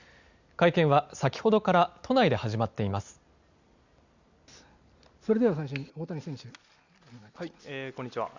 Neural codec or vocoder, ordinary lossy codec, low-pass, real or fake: none; Opus, 64 kbps; 7.2 kHz; real